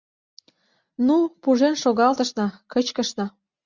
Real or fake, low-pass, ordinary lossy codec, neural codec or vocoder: real; 7.2 kHz; Opus, 64 kbps; none